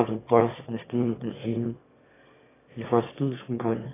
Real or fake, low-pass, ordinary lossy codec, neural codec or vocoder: fake; 3.6 kHz; AAC, 16 kbps; autoencoder, 22.05 kHz, a latent of 192 numbers a frame, VITS, trained on one speaker